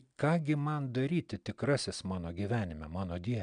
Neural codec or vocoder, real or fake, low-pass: none; real; 9.9 kHz